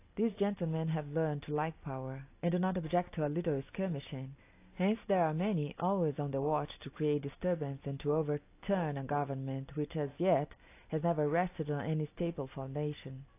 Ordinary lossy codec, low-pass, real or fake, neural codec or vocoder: AAC, 24 kbps; 3.6 kHz; real; none